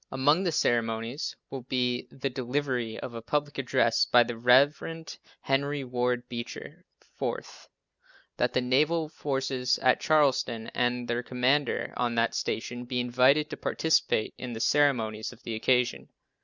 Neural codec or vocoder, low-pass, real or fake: none; 7.2 kHz; real